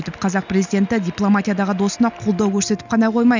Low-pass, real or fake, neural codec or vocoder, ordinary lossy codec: 7.2 kHz; real; none; none